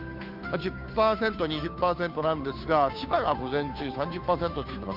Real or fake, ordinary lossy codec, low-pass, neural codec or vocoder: fake; AAC, 48 kbps; 5.4 kHz; codec, 16 kHz, 2 kbps, FunCodec, trained on Chinese and English, 25 frames a second